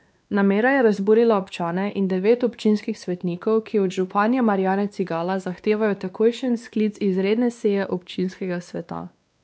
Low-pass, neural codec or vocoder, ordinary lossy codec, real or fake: none; codec, 16 kHz, 2 kbps, X-Codec, WavLM features, trained on Multilingual LibriSpeech; none; fake